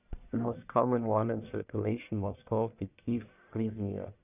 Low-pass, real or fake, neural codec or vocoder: 3.6 kHz; fake; codec, 44.1 kHz, 1.7 kbps, Pupu-Codec